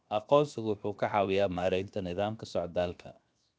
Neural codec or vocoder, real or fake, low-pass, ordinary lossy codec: codec, 16 kHz, 0.7 kbps, FocalCodec; fake; none; none